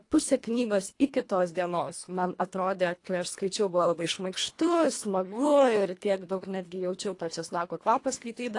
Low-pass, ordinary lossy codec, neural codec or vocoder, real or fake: 10.8 kHz; AAC, 48 kbps; codec, 24 kHz, 1.5 kbps, HILCodec; fake